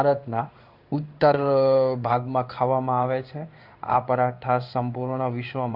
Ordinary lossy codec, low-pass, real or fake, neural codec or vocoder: Opus, 64 kbps; 5.4 kHz; fake; codec, 16 kHz in and 24 kHz out, 1 kbps, XY-Tokenizer